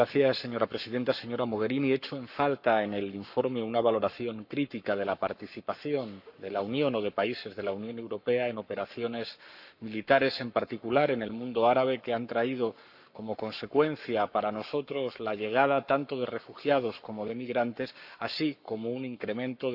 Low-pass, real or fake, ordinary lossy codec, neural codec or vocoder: 5.4 kHz; fake; none; codec, 44.1 kHz, 7.8 kbps, Pupu-Codec